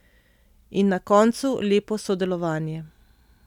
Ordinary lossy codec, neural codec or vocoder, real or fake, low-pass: none; none; real; 19.8 kHz